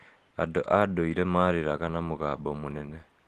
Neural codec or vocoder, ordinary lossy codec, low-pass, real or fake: none; Opus, 16 kbps; 14.4 kHz; real